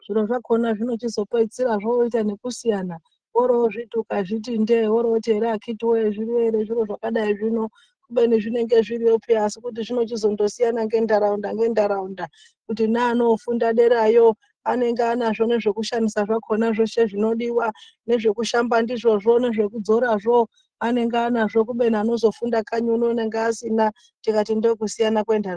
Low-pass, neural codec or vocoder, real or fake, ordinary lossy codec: 9.9 kHz; none; real; Opus, 16 kbps